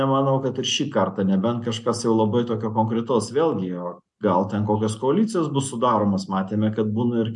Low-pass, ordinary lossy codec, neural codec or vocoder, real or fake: 10.8 kHz; MP3, 64 kbps; none; real